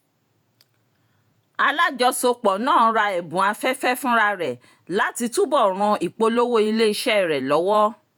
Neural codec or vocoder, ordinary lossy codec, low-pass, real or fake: vocoder, 48 kHz, 128 mel bands, Vocos; none; none; fake